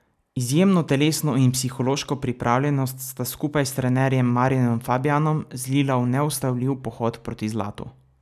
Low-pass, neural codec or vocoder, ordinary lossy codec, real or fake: 14.4 kHz; none; none; real